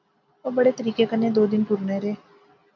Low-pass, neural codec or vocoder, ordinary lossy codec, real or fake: 7.2 kHz; none; MP3, 48 kbps; real